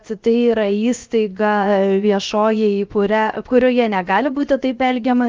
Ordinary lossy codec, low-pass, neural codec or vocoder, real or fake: Opus, 24 kbps; 7.2 kHz; codec, 16 kHz, 0.7 kbps, FocalCodec; fake